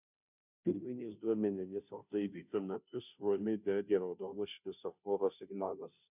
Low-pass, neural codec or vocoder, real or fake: 3.6 kHz; codec, 16 kHz, 0.5 kbps, FunCodec, trained on Chinese and English, 25 frames a second; fake